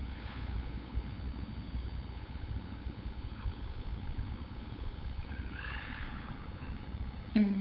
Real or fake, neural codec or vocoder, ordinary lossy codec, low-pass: fake; codec, 16 kHz, 8 kbps, FunCodec, trained on LibriTTS, 25 frames a second; none; 5.4 kHz